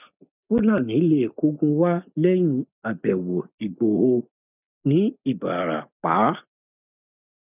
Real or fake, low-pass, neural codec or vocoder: real; 3.6 kHz; none